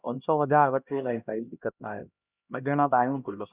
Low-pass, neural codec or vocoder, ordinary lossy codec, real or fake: 3.6 kHz; codec, 16 kHz, 1 kbps, X-Codec, HuBERT features, trained on LibriSpeech; Opus, 64 kbps; fake